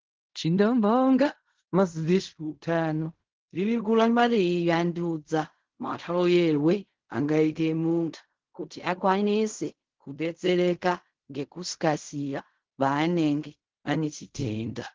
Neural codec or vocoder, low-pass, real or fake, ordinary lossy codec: codec, 16 kHz in and 24 kHz out, 0.4 kbps, LongCat-Audio-Codec, fine tuned four codebook decoder; 7.2 kHz; fake; Opus, 16 kbps